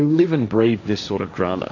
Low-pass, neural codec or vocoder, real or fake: 7.2 kHz; codec, 16 kHz, 1.1 kbps, Voila-Tokenizer; fake